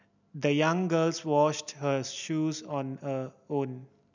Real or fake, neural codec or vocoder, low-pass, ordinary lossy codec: real; none; 7.2 kHz; none